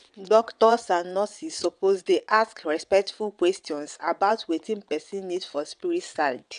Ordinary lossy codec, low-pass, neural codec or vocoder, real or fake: none; 9.9 kHz; vocoder, 22.05 kHz, 80 mel bands, WaveNeXt; fake